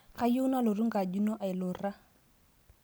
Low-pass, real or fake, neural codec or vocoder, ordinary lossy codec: none; real; none; none